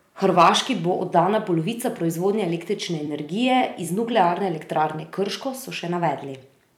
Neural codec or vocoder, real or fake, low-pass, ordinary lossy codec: vocoder, 44.1 kHz, 128 mel bands every 256 samples, BigVGAN v2; fake; 19.8 kHz; none